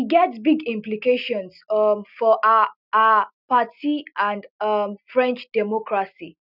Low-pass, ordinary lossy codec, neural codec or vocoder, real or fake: 5.4 kHz; none; none; real